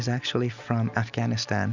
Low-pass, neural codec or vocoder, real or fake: 7.2 kHz; none; real